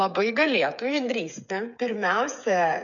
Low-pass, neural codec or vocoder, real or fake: 7.2 kHz; codec, 16 kHz, 8 kbps, FreqCodec, smaller model; fake